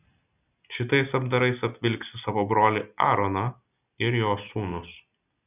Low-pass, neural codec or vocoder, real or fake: 3.6 kHz; none; real